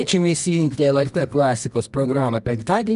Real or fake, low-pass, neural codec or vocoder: fake; 10.8 kHz; codec, 24 kHz, 0.9 kbps, WavTokenizer, medium music audio release